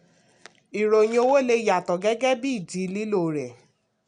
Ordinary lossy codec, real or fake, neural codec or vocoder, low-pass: none; real; none; 9.9 kHz